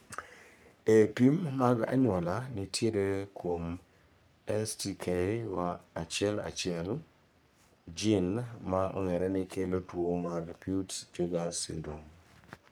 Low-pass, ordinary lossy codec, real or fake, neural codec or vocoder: none; none; fake; codec, 44.1 kHz, 3.4 kbps, Pupu-Codec